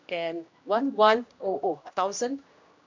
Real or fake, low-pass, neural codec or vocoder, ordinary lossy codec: fake; 7.2 kHz; codec, 16 kHz, 1 kbps, X-Codec, HuBERT features, trained on general audio; MP3, 64 kbps